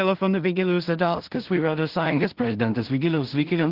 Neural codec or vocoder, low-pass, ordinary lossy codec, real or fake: codec, 16 kHz in and 24 kHz out, 0.4 kbps, LongCat-Audio-Codec, two codebook decoder; 5.4 kHz; Opus, 16 kbps; fake